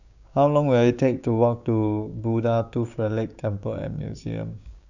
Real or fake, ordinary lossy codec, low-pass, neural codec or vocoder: fake; none; 7.2 kHz; codec, 16 kHz, 6 kbps, DAC